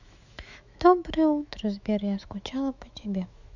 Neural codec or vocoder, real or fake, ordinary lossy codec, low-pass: autoencoder, 48 kHz, 128 numbers a frame, DAC-VAE, trained on Japanese speech; fake; none; 7.2 kHz